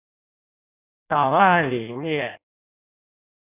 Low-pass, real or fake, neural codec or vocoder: 3.6 kHz; fake; codec, 16 kHz in and 24 kHz out, 0.6 kbps, FireRedTTS-2 codec